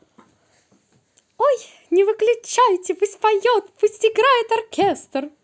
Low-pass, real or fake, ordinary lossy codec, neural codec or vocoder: none; real; none; none